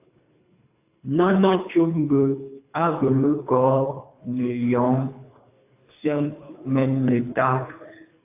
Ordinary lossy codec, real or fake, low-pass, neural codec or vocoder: AAC, 24 kbps; fake; 3.6 kHz; codec, 24 kHz, 3 kbps, HILCodec